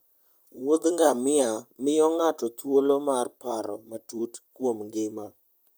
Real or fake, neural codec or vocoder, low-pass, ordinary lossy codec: fake; vocoder, 44.1 kHz, 128 mel bands, Pupu-Vocoder; none; none